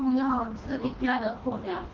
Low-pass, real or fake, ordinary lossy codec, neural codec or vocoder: 7.2 kHz; fake; Opus, 32 kbps; codec, 24 kHz, 1.5 kbps, HILCodec